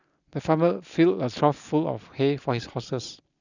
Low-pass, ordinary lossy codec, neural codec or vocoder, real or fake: 7.2 kHz; none; none; real